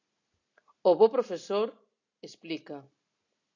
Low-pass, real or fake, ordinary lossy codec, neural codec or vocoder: 7.2 kHz; real; AAC, 48 kbps; none